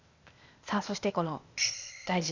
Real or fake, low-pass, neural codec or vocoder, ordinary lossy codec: fake; 7.2 kHz; codec, 16 kHz, 0.8 kbps, ZipCodec; Opus, 64 kbps